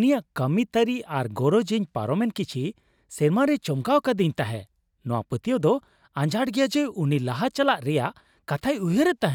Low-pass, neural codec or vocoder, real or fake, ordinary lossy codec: 19.8 kHz; none; real; none